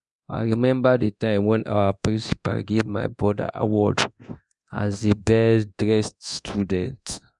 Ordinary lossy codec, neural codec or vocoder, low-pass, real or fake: none; codec, 24 kHz, 0.9 kbps, DualCodec; 10.8 kHz; fake